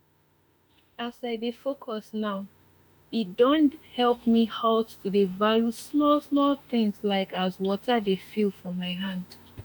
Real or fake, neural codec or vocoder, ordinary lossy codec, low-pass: fake; autoencoder, 48 kHz, 32 numbers a frame, DAC-VAE, trained on Japanese speech; none; none